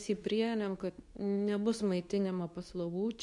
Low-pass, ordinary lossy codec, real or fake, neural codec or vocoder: 10.8 kHz; MP3, 96 kbps; fake; codec, 24 kHz, 0.9 kbps, WavTokenizer, medium speech release version 2